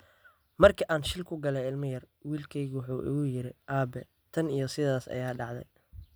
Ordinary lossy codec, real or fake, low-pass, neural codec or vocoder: none; real; none; none